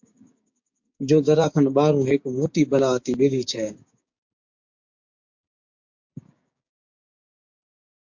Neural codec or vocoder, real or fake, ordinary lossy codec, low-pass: codec, 16 kHz, 6 kbps, DAC; fake; MP3, 48 kbps; 7.2 kHz